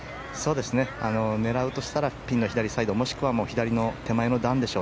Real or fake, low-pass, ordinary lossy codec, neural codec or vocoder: real; none; none; none